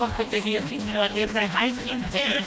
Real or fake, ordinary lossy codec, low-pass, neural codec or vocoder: fake; none; none; codec, 16 kHz, 1 kbps, FreqCodec, smaller model